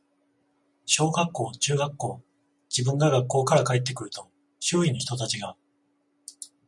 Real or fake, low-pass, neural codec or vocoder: real; 10.8 kHz; none